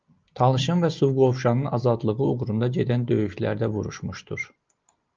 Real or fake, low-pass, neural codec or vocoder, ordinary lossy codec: real; 7.2 kHz; none; Opus, 24 kbps